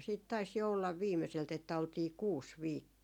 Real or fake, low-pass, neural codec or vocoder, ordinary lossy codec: real; 19.8 kHz; none; none